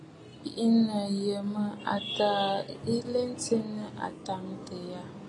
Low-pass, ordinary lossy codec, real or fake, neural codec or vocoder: 10.8 kHz; AAC, 32 kbps; real; none